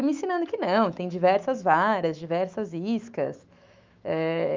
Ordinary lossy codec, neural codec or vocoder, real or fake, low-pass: Opus, 24 kbps; autoencoder, 48 kHz, 128 numbers a frame, DAC-VAE, trained on Japanese speech; fake; 7.2 kHz